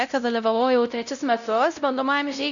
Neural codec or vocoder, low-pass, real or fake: codec, 16 kHz, 0.5 kbps, X-Codec, WavLM features, trained on Multilingual LibriSpeech; 7.2 kHz; fake